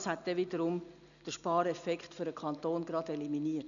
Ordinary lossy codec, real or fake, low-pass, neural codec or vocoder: none; real; 7.2 kHz; none